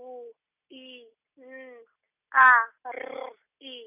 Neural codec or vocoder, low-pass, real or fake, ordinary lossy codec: none; 3.6 kHz; real; none